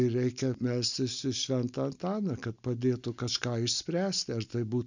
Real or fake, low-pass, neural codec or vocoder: real; 7.2 kHz; none